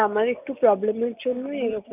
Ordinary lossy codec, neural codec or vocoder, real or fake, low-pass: none; none; real; 3.6 kHz